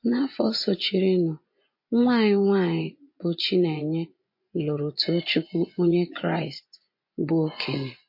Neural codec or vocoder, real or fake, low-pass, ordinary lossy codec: vocoder, 24 kHz, 100 mel bands, Vocos; fake; 5.4 kHz; MP3, 32 kbps